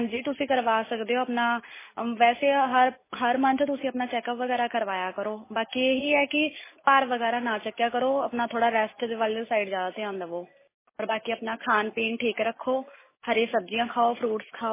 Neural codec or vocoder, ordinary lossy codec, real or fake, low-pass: none; MP3, 16 kbps; real; 3.6 kHz